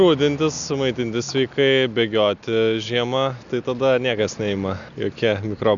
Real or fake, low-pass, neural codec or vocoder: real; 7.2 kHz; none